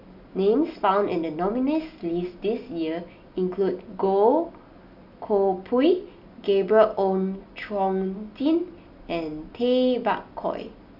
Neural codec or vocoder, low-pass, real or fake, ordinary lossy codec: none; 5.4 kHz; real; none